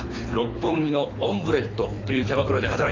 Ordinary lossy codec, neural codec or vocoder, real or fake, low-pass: AAC, 32 kbps; codec, 24 kHz, 3 kbps, HILCodec; fake; 7.2 kHz